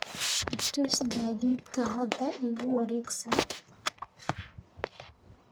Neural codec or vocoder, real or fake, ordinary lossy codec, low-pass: codec, 44.1 kHz, 1.7 kbps, Pupu-Codec; fake; none; none